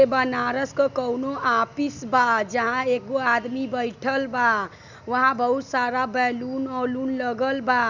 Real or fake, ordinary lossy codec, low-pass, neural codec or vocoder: real; none; 7.2 kHz; none